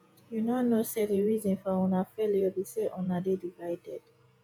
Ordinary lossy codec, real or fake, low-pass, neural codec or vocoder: none; fake; none; vocoder, 48 kHz, 128 mel bands, Vocos